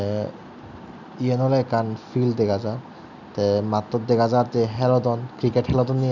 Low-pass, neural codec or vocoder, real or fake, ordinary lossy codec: 7.2 kHz; none; real; none